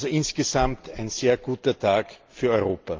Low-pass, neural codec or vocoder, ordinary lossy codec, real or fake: 7.2 kHz; none; Opus, 24 kbps; real